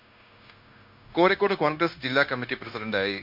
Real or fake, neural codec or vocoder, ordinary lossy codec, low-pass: fake; codec, 16 kHz, 0.9 kbps, LongCat-Audio-Codec; MP3, 48 kbps; 5.4 kHz